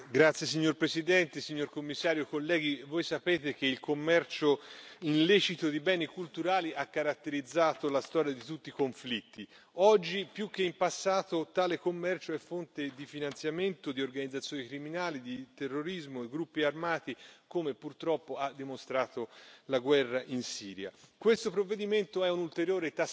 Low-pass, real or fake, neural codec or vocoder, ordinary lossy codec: none; real; none; none